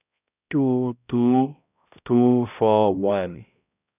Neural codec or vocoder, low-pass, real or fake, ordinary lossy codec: codec, 16 kHz, 0.5 kbps, X-Codec, HuBERT features, trained on balanced general audio; 3.6 kHz; fake; none